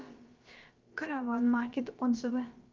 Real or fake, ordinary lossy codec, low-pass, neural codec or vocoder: fake; Opus, 24 kbps; 7.2 kHz; codec, 16 kHz, about 1 kbps, DyCAST, with the encoder's durations